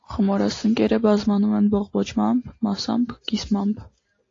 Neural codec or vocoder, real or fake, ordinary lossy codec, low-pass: none; real; AAC, 32 kbps; 7.2 kHz